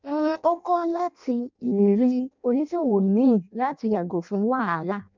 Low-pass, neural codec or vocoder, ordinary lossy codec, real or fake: 7.2 kHz; codec, 16 kHz in and 24 kHz out, 0.6 kbps, FireRedTTS-2 codec; MP3, 64 kbps; fake